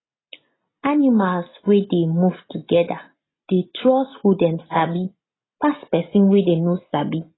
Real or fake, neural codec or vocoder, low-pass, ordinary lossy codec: real; none; 7.2 kHz; AAC, 16 kbps